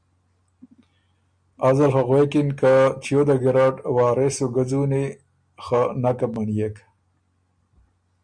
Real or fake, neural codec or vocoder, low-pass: real; none; 9.9 kHz